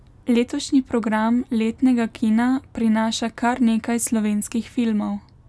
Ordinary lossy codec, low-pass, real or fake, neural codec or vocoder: none; none; real; none